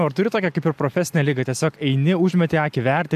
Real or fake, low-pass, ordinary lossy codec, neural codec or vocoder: real; 14.4 kHz; AAC, 96 kbps; none